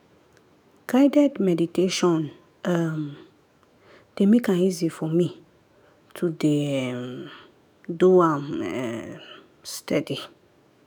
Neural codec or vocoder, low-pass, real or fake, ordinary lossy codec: autoencoder, 48 kHz, 128 numbers a frame, DAC-VAE, trained on Japanese speech; none; fake; none